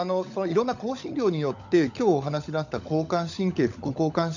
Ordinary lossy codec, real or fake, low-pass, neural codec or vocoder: none; fake; 7.2 kHz; codec, 16 kHz, 16 kbps, FunCodec, trained on LibriTTS, 50 frames a second